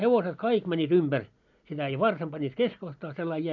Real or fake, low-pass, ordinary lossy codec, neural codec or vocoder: real; 7.2 kHz; none; none